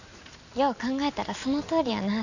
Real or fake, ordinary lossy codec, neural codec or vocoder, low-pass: real; none; none; 7.2 kHz